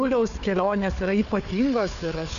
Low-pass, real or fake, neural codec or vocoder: 7.2 kHz; fake; codec, 16 kHz, 4 kbps, FunCodec, trained on Chinese and English, 50 frames a second